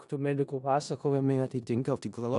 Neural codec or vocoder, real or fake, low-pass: codec, 16 kHz in and 24 kHz out, 0.4 kbps, LongCat-Audio-Codec, four codebook decoder; fake; 10.8 kHz